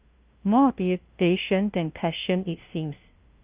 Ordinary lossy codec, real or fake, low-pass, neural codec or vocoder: Opus, 32 kbps; fake; 3.6 kHz; codec, 16 kHz, 0.5 kbps, FunCodec, trained on LibriTTS, 25 frames a second